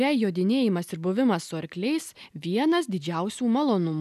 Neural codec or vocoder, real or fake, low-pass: none; real; 14.4 kHz